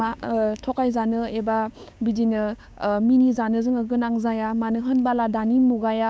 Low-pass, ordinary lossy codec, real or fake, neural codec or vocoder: none; none; fake; codec, 16 kHz, 6 kbps, DAC